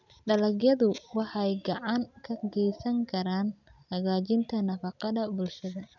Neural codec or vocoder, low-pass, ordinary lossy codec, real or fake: none; 7.2 kHz; none; real